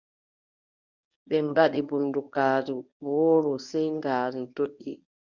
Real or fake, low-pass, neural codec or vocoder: fake; 7.2 kHz; codec, 24 kHz, 0.9 kbps, WavTokenizer, medium speech release version 1